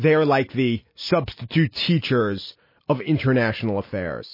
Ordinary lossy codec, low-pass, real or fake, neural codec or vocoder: MP3, 24 kbps; 5.4 kHz; real; none